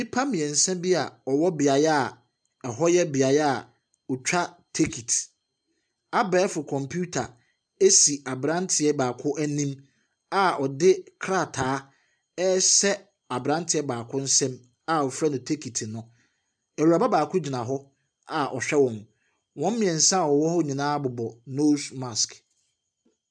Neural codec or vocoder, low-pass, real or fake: none; 9.9 kHz; real